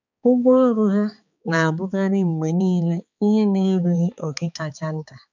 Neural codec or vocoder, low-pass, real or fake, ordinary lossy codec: codec, 16 kHz, 4 kbps, X-Codec, HuBERT features, trained on balanced general audio; 7.2 kHz; fake; none